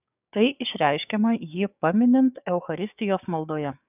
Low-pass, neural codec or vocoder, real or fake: 3.6 kHz; codec, 16 kHz, 6 kbps, DAC; fake